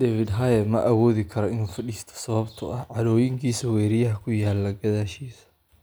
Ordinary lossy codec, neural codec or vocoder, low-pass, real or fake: none; none; none; real